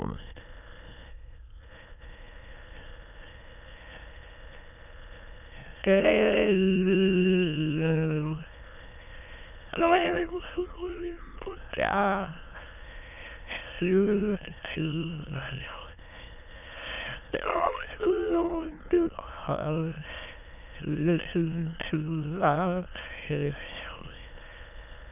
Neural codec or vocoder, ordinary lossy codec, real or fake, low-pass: autoencoder, 22.05 kHz, a latent of 192 numbers a frame, VITS, trained on many speakers; none; fake; 3.6 kHz